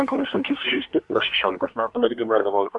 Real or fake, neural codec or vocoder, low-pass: fake; codec, 24 kHz, 1 kbps, SNAC; 10.8 kHz